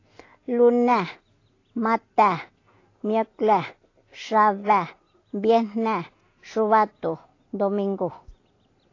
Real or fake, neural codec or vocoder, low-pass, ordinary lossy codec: real; none; 7.2 kHz; AAC, 32 kbps